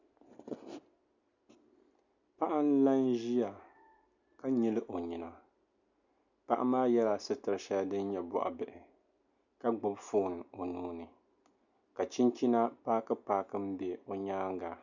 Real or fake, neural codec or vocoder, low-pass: real; none; 7.2 kHz